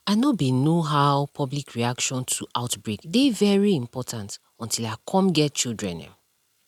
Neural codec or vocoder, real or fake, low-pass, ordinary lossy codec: none; real; 19.8 kHz; none